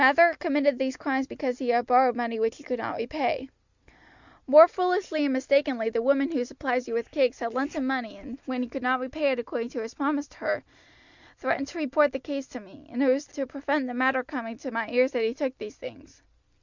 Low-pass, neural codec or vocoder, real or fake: 7.2 kHz; none; real